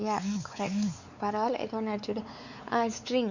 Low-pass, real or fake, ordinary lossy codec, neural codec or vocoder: 7.2 kHz; fake; none; codec, 16 kHz, 4 kbps, X-Codec, WavLM features, trained on Multilingual LibriSpeech